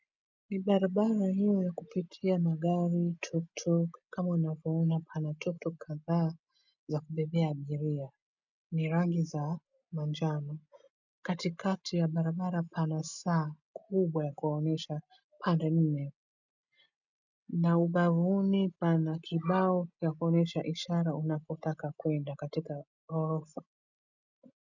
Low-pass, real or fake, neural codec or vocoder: 7.2 kHz; real; none